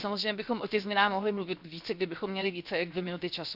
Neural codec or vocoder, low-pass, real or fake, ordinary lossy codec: codec, 16 kHz, 0.7 kbps, FocalCodec; 5.4 kHz; fake; Opus, 64 kbps